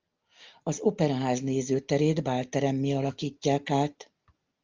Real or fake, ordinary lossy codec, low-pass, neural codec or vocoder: real; Opus, 32 kbps; 7.2 kHz; none